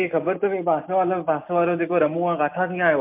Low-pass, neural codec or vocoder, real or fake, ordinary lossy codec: 3.6 kHz; none; real; MP3, 32 kbps